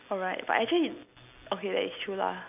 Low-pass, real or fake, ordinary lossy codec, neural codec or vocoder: 3.6 kHz; real; none; none